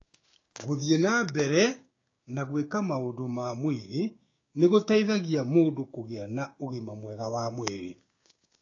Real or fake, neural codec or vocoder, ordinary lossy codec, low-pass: fake; codec, 16 kHz, 6 kbps, DAC; AAC, 32 kbps; 7.2 kHz